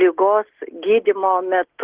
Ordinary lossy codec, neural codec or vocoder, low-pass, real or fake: Opus, 16 kbps; none; 3.6 kHz; real